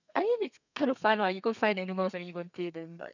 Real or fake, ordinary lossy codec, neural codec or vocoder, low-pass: fake; none; codec, 32 kHz, 1.9 kbps, SNAC; 7.2 kHz